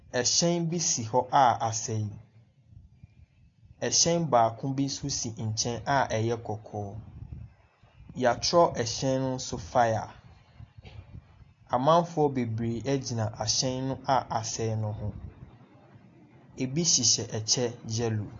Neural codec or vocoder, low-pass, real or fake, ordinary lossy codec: none; 7.2 kHz; real; AAC, 48 kbps